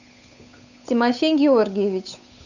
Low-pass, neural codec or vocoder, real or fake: 7.2 kHz; codec, 16 kHz, 8 kbps, FunCodec, trained on Chinese and English, 25 frames a second; fake